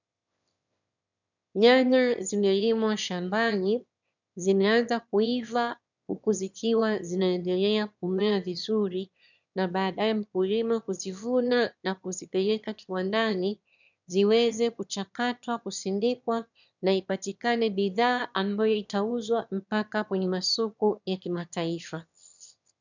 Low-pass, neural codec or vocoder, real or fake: 7.2 kHz; autoencoder, 22.05 kHz, a latent of 192 numbers a frame, VITS, trained on one speaker; fake